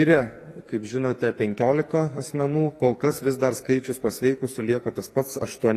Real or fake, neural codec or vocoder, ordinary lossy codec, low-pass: fake; codec, 44.1 kHz, 2.6 kbps, SNAC; AAC, 48 kbps; 14.4 kHz